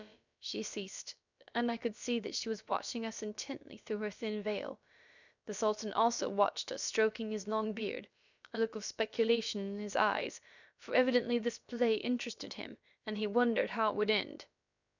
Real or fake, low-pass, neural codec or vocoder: fake; 7.2 kHz; codec, 16 kHz, about 1 kbps, DyCAST, with the encoder's durations